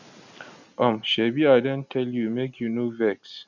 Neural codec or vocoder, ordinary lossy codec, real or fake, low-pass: none; none; real; 7.2 kHz